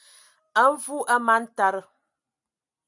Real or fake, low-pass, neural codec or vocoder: real; 10.8 kHz; none